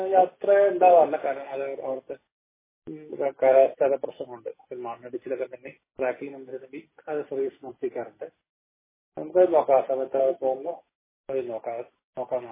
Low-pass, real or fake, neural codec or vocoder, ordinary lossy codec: 3.6 kHz; real; none; MP3, 16 kbps